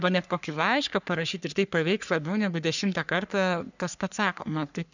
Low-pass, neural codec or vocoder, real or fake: 7.2 kHz; codec, 44.1 kHz, 3.4 kbps, Pupu-Codec; fake